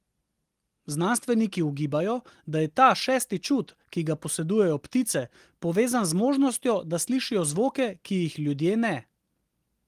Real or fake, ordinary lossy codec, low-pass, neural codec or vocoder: real; Opus, 24 kbps; 14.4 kHz; none